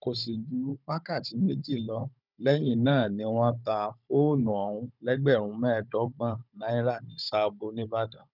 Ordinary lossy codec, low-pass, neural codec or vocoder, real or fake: none; 5.4 kHz; codec, 16 kHz, 16 kbps, FunCodec, trained on Chinese and English, 50 frames a second; fake